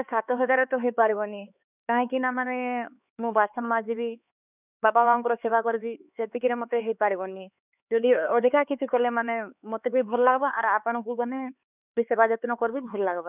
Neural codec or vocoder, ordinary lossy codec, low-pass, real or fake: codec, 16 kHz, 4 kbps, X-Codec, HuBERT features, trained on LibriSpeech; none; 3.6 kHz; fake